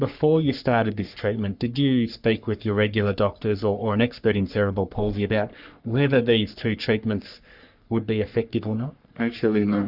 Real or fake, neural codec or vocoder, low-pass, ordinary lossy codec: fake; codec, 44.1 kHz, 3.4 kbps, Pupu-Codec; 5.4 kHz; Opus, 64 kbps